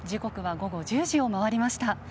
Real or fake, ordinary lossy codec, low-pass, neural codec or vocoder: real; none; none; none